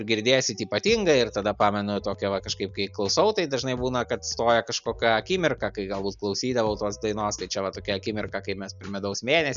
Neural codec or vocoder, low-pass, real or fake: none; 7.2 kHz; real